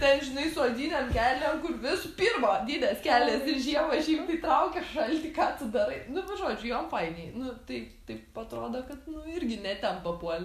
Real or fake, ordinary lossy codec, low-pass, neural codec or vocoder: real; MP3, 96 kbps; 14.4 kHz; none